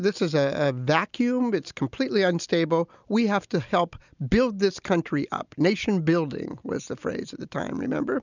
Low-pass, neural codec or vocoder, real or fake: 7.2 kHz; none; real